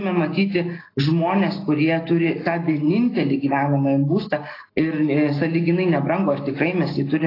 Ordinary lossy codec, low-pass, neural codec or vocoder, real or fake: AAC, 24 kbps; 5.4 kHz; none; real